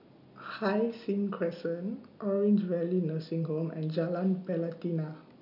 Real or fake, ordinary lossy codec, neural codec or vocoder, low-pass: real; none; none; 5.4 kHz